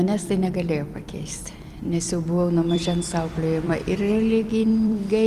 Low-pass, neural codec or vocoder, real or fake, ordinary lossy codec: 14.4 kHz; vocoder, 44.1 kHz, 128 mel bands every 256 samples, BigVGAN v2; fake; Opus, 32 kbps